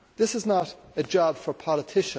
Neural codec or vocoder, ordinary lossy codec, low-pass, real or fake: none; none; none; real